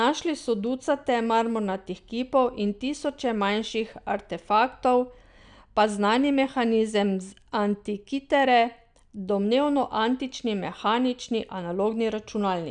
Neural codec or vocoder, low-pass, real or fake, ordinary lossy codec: none; 9.9 kHz; real; none